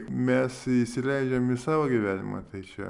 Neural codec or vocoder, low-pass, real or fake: none; 10.8 kHz; real